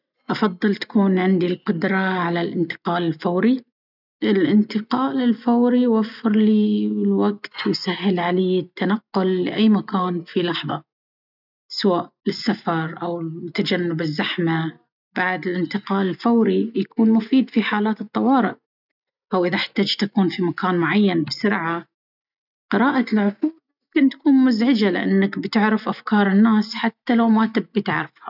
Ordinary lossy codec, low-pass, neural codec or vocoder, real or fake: none; 5.4 kHz; none; real